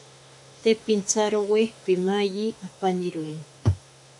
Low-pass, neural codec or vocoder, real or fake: 10.8 kHz; autoencoder, 48 kHz, 32 numbers a frame, DAC-VAE, trained on Japanese speech; fake